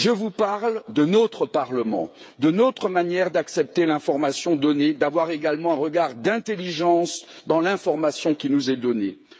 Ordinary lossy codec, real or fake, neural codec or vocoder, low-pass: none; fake; codec, 16 kHz, 8 kbps, FreqCodec, smaller model; none